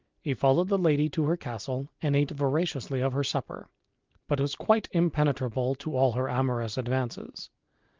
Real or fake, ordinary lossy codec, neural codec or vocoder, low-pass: real; Opus, 24 kbps; none; 7.2 kHz